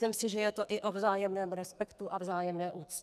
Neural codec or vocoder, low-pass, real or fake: codec, 44.1 kHz, 2.6 kbps, SNAC; 14.4 kHz; fake